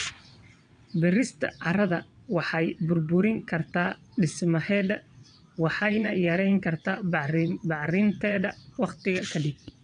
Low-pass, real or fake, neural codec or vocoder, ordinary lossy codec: 9.9 kHz; fake; vocoder, 22.05 kHz, 80 mel bands, WaveNeXt; AAC, 64 kbps